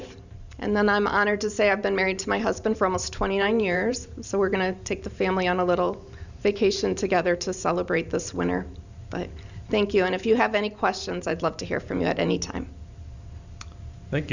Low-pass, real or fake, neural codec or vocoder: 7.2 kHz; fake; vocoder, 44.1 kHz, 128 mel bands every 512 samples, BigVGAN v2